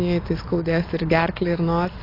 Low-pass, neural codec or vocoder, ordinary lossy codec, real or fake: 5.4 kHz; none; AAC, 24 kbps; real